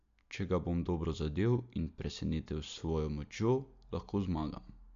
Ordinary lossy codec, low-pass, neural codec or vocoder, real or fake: MP3, 64 kbps; 7.2 kHz; none; real